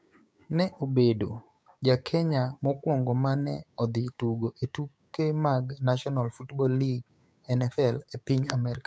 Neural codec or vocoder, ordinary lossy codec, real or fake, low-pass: codec, 16 kHz, 6 kbps, DAC; none; fake; none